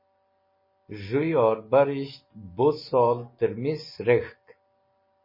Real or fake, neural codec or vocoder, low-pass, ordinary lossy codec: real; none; 5.4 kHz; MP3, 24 kbps